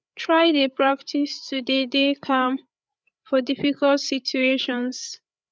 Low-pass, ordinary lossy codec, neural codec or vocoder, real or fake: none; none; codec, 16 kHz, 8 kbps, FreqCodec, larger model; fake